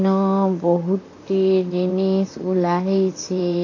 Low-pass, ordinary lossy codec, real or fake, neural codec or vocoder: 7.2 kHz; AAC, 32 kbps; fake; vocoder, 44.1 kHz, 128 mel bands, Pupu-Vocoder